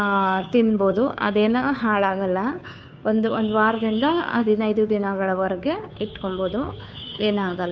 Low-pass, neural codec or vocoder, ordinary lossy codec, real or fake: none; codec, 16 kHz, 2 kbps, FunCodec, trained on Chinese and English, 25 frames a second; none; fake